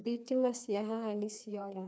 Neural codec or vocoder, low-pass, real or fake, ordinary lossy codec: codec, 16 kHz, 2 kbps, FreqCodec, larger model; none; fake; none